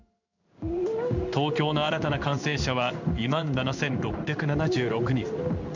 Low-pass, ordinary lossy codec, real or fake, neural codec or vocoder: 7.2 kHz; none; fake; codec, 16 kHz in and 24 kHz out, 1 kbps, XY-Tokenizer